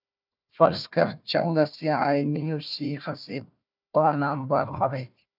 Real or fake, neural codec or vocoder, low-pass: fake; codec, 16 kHz, 1 kbps, FunCodec, trained on Chinese and English, 50 frames a second; 5.4 kHz